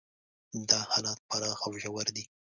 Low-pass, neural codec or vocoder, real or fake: 7.2 kHz; none; real